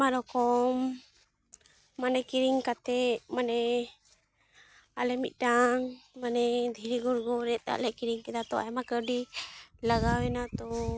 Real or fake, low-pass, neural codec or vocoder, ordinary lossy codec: real; none; none; none